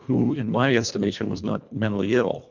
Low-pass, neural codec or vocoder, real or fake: 7.2 kHz; codec, 24 kHz, 1.5 kbps, HILCodec; fake